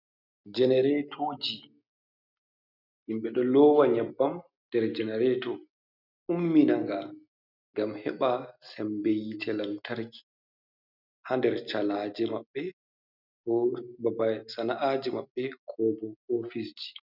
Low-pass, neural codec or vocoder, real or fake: 5.4 kHz; none; real